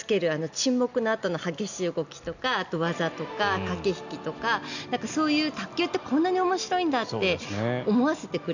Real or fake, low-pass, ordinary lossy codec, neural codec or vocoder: real; 7.2 kHz; none; none